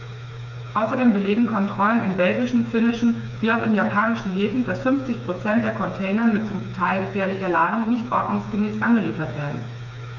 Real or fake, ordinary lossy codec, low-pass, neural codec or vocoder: fake; none; 7.2 kHz; codec, 16 kHz, 4 kbps, FreqCodec, smaller model